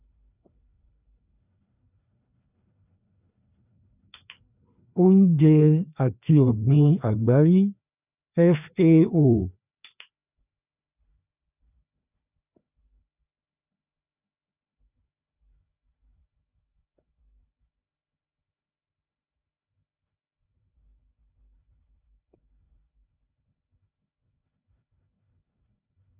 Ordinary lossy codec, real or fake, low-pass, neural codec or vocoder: none; fake; 3.6 kHz; codec, 16 kHz, 2 kbps, FreqCodec, larger model